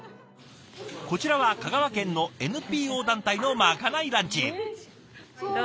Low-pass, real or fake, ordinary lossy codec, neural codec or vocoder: none; real; none; none